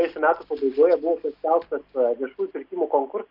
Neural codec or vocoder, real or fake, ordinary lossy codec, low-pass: none; real; MP3, 48 kbps; 5.4 kHz